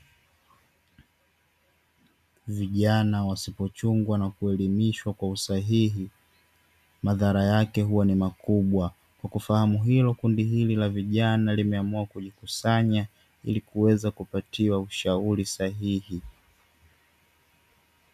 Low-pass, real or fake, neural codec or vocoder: 14.4 kHz; real; none